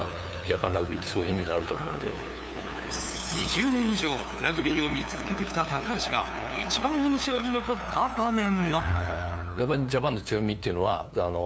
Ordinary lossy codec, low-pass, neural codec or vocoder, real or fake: none; none; codec, 16 kHz, 2 kbps, FunCodec, trained on LibriTTS, 25 frames a second; fake